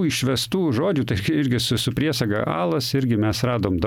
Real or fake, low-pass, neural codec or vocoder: real; 19.8 kHz; none